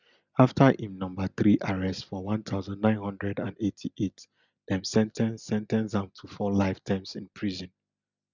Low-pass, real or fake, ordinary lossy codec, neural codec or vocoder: 7.2 kHz; real; none; none